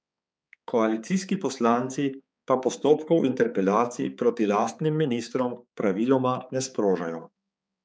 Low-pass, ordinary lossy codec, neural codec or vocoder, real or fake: none; none; codec, 16 kHz, 4 kbps, X-Codec, HuBERT features, trained on balanced general audio; fake